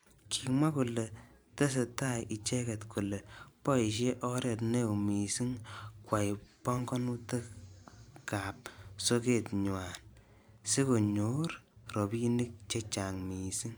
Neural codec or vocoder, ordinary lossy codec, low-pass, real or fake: vocoder, 44.1 kHz, 128 mel bands every 512 samples, BigVGAN v2; none; none; fake